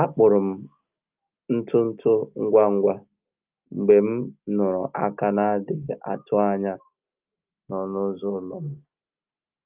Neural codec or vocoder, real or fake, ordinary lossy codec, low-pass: none; real; Opus, 24 kbps; 3.6 kHz